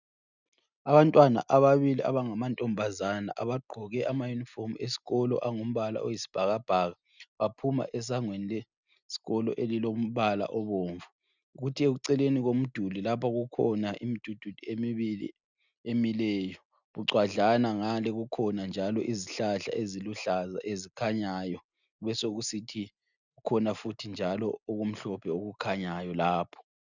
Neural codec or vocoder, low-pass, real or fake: none; 7.2 kHz; real